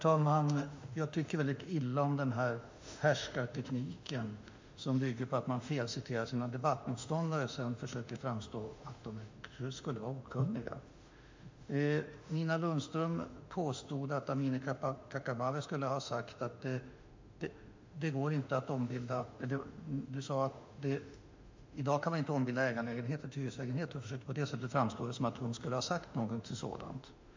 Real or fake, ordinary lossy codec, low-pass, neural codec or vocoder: fake; MP3, 64 kbps; 7.2 kHz; autoencoder, 48 kHz, 32 numbers a frame, DAC-VAE, trained on Japanese speech